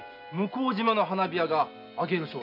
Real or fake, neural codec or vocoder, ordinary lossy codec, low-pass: real; none; none; 5.4 kHz